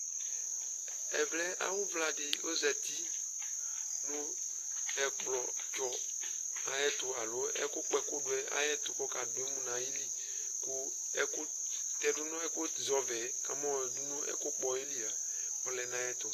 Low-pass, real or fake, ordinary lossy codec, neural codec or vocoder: 14.4 kHz; real; AAC, 48 kbps; none